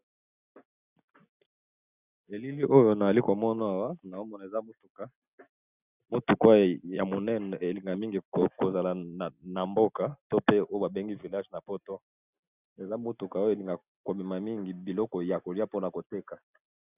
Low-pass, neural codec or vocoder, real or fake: 3.6 kHz; none; real